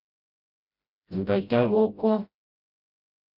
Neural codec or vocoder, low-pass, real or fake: codec, 16 kHz, 0.5 kbps, FreqCodec, smaller model; 5.4 kHz; fake